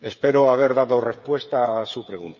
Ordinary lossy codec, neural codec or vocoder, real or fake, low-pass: none; codec, 16 kHz, 8 kbps, FreqCodec, smaller model; fake; 7.2 kHz